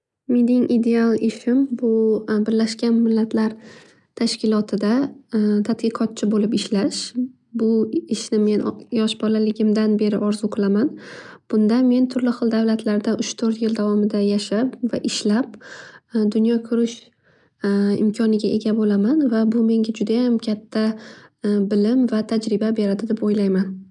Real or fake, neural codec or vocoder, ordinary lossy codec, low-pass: real; none; none; 10.8 kHz